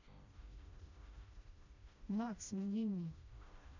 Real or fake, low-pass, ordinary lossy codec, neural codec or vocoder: fake; 7.2 kHz; none; codec, 16 kHz, 1 kbps, FreqCodec, smaller model